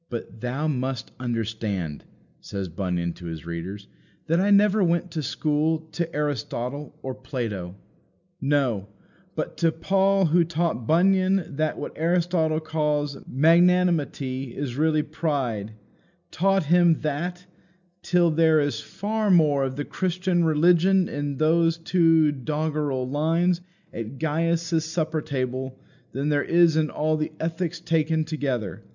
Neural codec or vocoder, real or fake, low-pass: none; real; 7.2 kHz